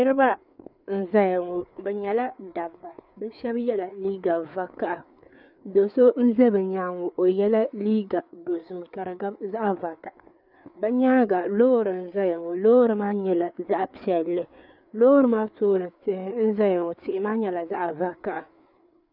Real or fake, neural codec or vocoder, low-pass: fake; codec, 16 kHz, 4 kbps, FreqCodec, larger model; 5.4 kHz